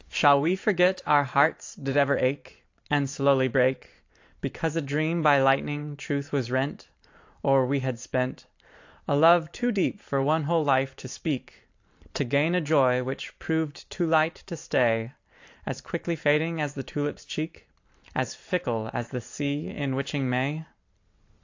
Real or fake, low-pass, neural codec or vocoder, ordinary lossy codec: fake; 7.2 kHz; vocoder, 44.1 kHz, 128 mel bands every 512 samples, BigVGAN v2; AAC, 48 kbps